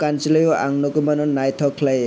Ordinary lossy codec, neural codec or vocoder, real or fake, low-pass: none; none; real; none